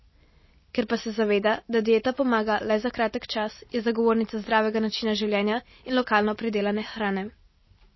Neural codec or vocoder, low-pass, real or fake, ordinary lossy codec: none; 7.2 kHz; real; MP3, 24 kbps